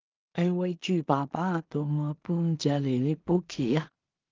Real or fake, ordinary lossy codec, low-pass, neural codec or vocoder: fake; Opus, 32 kbps; 7.2 kHz; codec, 16 kHz in and 24 kHz out, 0.4 kbps, LongCat-Audio-Codec, two codebook decoder